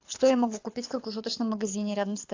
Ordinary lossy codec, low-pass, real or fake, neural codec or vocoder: AAC, 48 kbps; 7.2 kHz; fake; codec, 24 kHz, 6 kbps, HILCodec